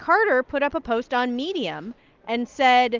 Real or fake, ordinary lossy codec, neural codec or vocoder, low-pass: real; Opus, 24 kbps; none; 7.2 kHz